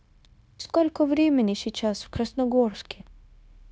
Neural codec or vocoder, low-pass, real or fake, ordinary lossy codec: codec, 16 kHz, 0.9 kbps, LongCat-Audio-Codec; none; fake; none